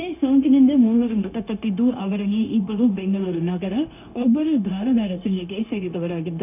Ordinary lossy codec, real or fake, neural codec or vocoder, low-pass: none; fake; codec, 16 kHz, 0.9 kbps, LongCat-Audio-Codec; 3.6 kHz